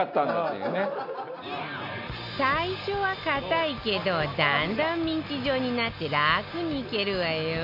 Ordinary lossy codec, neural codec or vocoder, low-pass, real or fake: MP3, 48 kbps; none; 5.4 kHz; real